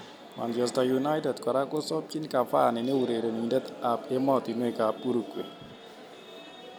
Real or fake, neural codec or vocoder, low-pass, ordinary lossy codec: real; none; none; none